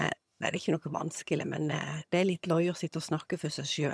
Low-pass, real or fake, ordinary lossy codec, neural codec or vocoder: none; fake; none; vocoder, 22.05 kHz, 80 mel bands, HiFi-GAN